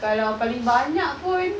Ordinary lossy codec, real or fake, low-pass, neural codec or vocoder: none; real; none; none